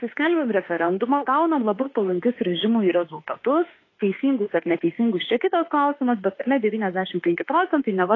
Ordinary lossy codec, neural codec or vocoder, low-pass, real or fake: AAC, 32 kbps; autoencoder, 48 kHz, 32 numbers a frame, DAC-VAE, trained on Japanese speech; 7.2 kHz; fake